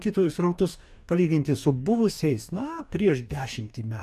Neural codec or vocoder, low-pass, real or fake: codec, 44.1 kHz, 2.6 kbps, DAC; 14.4 kHz; fake